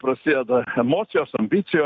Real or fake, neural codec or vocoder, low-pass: fake; vocoder, 44.1 kHz, 128 mel bands every 256 samples, BigVGAN v2; 7.2 kHz